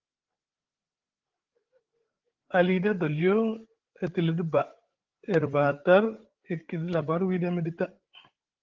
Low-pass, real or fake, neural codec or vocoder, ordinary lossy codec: 7.2 kHz; fake; codec, 16 kHz, 8 kbps, FreqCodec, larger model; Opus, 16 kbps